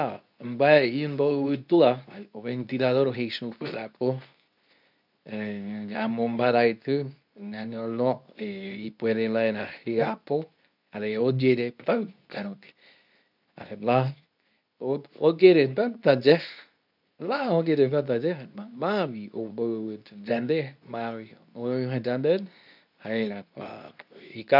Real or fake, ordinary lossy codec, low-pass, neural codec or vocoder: fake; none; 5.4 kHz; codec, 24 kHz, 0.9 kbps, WavTokenizer, medium speech release version 2